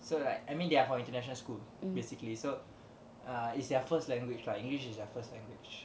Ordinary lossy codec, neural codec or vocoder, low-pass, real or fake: none; none; none; real